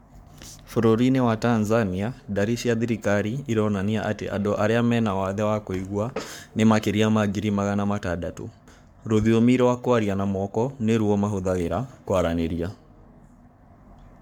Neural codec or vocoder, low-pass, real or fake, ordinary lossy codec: codec, 44.1 kHz, 7.8 kbps, Pupu-Codec; 19.8 kHz; fake; MP3, 96 kbps